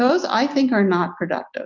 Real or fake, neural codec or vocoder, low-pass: real; none; 7.2 kHz